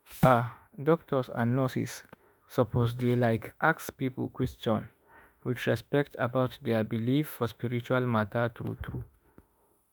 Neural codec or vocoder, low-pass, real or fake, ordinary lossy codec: autoencoder, 48 kHz, 32 numbers a frame, DAC-VAE, trained on Japanese speech; none; fake; none